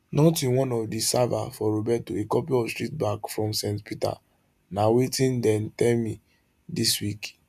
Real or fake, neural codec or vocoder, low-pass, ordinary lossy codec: real; none; 14.4 kHz; none